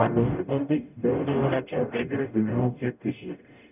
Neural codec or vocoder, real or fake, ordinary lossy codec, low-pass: codec, 44.1 kHz, 0.9 kbps, DAC; fake; none; 3.6 kHz